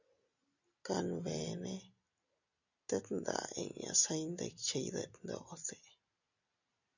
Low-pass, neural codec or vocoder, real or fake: 7.2 kHz; none; real